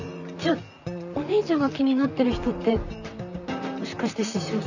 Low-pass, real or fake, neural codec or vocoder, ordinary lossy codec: 7.2 kHz; fake; vocoder, 44.1 kHz, 128 mel bands, Pupu-Vocoder; none